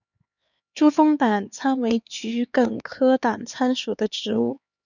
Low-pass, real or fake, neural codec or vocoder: 7.2 kHz; fake; codec, 16 kHz, 4 kbps, X-Codec, HuBERT features, trained on LibriSpeech